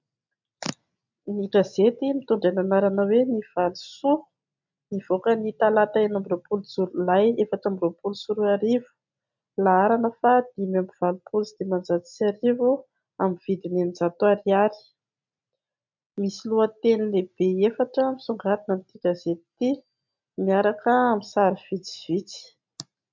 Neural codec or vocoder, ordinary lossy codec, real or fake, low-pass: none; MP3, 64 kbps; real; 7.2 kHz